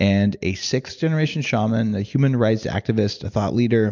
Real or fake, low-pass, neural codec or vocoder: real; 7.2 kHz; none